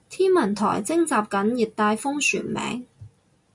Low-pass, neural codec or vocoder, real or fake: 10.8 kHz; none; real